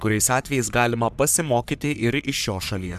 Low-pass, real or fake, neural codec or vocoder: 14.4 kHz; fake; codec, 44.1 kHz, 3.4 kbps, Pupu-Codec